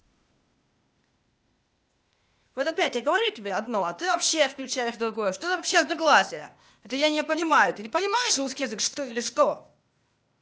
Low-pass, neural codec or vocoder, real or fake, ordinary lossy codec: none; codec, 16 kHz, 0.8 kbps, ZipCodec; fake; none